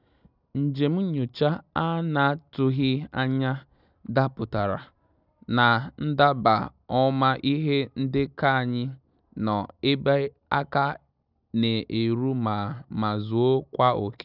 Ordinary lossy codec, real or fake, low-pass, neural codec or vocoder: none; real; 5.4 kHz; none